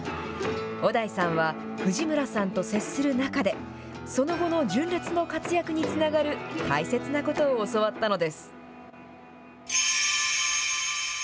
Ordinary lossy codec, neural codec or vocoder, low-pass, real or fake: none; none; none; real